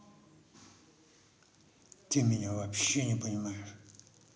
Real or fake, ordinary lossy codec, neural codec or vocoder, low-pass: real; none; none; none